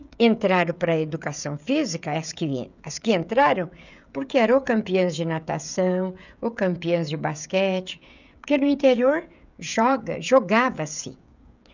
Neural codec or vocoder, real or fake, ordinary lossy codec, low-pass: codec, 16 kHz, 16 kbps, FreqCodec, smaller model; fake; none; 7.2 kHz